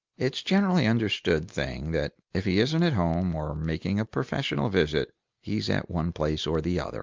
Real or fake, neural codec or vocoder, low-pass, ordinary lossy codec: real; none; 7.2 kHz; Opus, 32 kbps